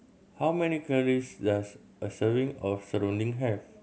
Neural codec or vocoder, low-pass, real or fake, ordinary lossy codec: none; none; real; none